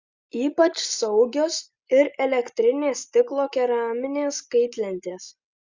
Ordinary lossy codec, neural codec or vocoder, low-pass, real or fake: Opus, 64 kbps; none; 7.2 kHz; real